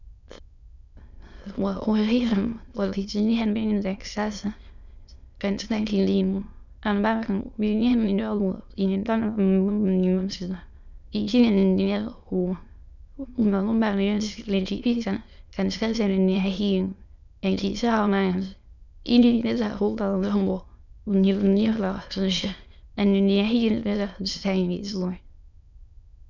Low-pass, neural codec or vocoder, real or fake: 7.2 kHz; autoencoder, 22.05 kHz, a latent of 192 numbers a frame, VITS, trained on many speakers; fake